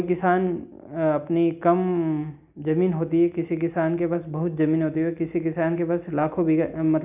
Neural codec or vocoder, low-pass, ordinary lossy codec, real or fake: none; 3.6 kHz; none; real